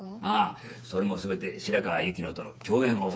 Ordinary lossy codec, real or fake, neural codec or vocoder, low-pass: none; fake; codec, 16 kHz, 4 kbps, FreqCodec, smaller model; none